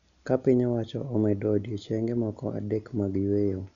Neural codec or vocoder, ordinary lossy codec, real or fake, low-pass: none; MP3, 96 kbps; real; 7.2 kHz